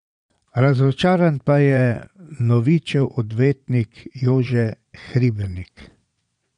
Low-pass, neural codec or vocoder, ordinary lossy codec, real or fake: 9.9 kHz; vocoder, 22.05 kHz, 80 mel bands, Vocos; none; fake